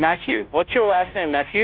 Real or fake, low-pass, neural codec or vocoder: fake; 5.4 kHz; codec, 16 kHz, 0.5 kbps, FunCodec, trained on Chinese and English, 25 frames a second